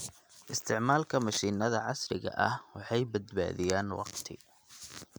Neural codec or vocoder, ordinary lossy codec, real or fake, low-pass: none; none; real; none